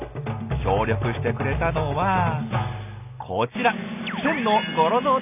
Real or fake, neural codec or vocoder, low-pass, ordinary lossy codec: real; none; 3.6 kHz; none